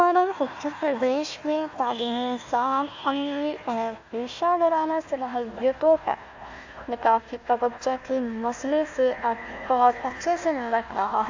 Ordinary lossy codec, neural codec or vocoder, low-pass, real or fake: AAC, 48 kbps; codec, 16 kHz, 1 kbps, FunCodec, trained on Chinese and English, 50 frames a second; 7.2 kHz; fake